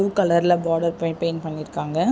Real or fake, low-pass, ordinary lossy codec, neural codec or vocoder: real; none; none; none